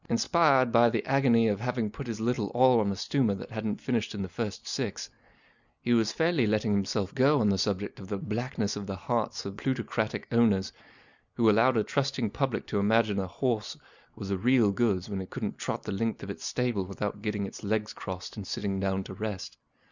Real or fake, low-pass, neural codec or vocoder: real; 7.2 kHz; none